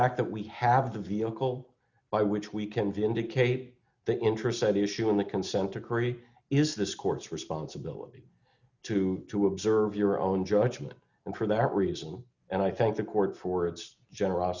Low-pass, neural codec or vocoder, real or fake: 7.2 kHz; none; real